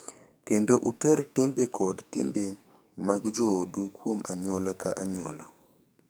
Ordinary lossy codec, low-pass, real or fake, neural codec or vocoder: none; none; fake; codec, 44.1 kHz, 2.6 kbps, SNAC